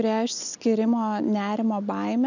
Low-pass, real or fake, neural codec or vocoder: 7.2 kHz; real; none